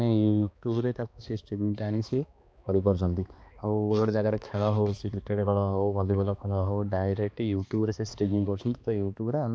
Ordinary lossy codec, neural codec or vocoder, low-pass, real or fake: none; codec, 16 kHz, 2 kbps, X-Codec, HuBERT features, trained on balanced general audio; none; fake